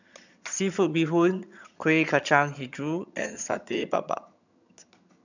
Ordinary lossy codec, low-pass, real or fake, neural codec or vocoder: none; 7.2 kHz; fake; vocoder, 22.05 kHz, 80 mel bands, HiFi-GAN